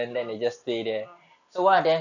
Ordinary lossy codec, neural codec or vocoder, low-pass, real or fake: none; none; 7.2 kHz; real